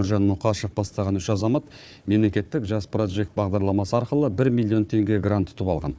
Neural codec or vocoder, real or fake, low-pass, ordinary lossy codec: codec, 16 kHz, 4 kbps, FunCodec, trained on Chinese and English, 50 frames a second; fake; none; none